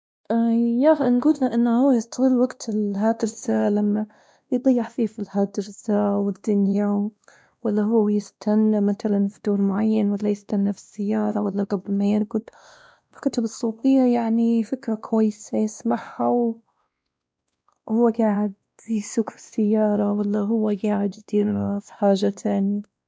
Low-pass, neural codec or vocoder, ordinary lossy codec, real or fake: none; codec, 16 kHz, 1 kbps, X-Codec, WavLM features, trained on Multilingual LibriSpeech; none; fake